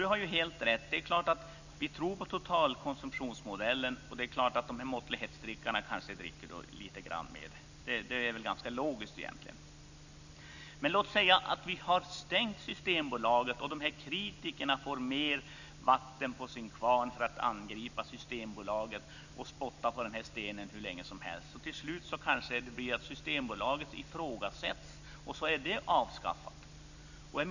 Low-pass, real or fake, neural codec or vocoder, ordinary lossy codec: 7.2 kHz; real; none; none